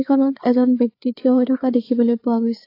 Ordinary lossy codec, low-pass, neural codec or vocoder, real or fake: AAC, 32 kbps; 5.4 kHz; codec, 16 kHz, 4 kbps, FreqCodec, larger model; fake